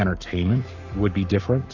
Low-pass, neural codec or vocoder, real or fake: 7.2 kHz; codec, 44.1 kHz, 7.8 kbps, Pupu-Codec; fake